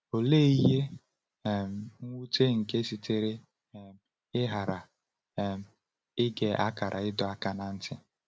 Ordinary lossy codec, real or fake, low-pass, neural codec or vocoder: none; real; none; none